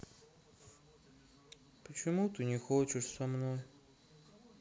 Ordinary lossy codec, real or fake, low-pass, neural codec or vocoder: none; real; none; none